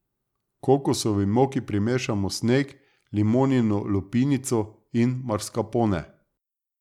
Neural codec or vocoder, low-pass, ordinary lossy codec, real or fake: none; 19.8 kHz; none; real